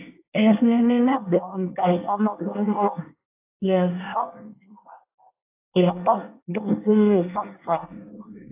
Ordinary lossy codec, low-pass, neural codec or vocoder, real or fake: AAC, 32 kbps; 3.6 kHz; codec, 24 kHz, 1 kbps, SNAC; fake